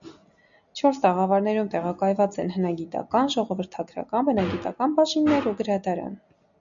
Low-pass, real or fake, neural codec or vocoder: 7.2 kHz; real; none